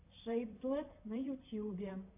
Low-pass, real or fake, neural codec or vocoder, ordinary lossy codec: 3.6 kHz; fake; vocoder, 44.1 kHz, 128 mel bands, Pupu-Vocoder; MP3, 24 kbps